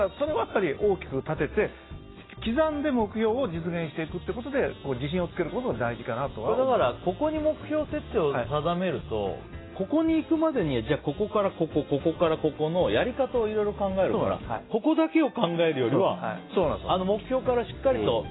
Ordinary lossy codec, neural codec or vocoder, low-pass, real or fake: AAC, 16 kbps; none; 7.2 kHz; real